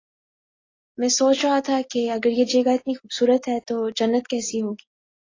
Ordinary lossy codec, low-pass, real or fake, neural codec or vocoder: AAC, 32 kbps; 7.2 kHz; real; none